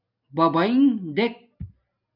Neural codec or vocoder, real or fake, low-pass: none; real; 5.4 kHz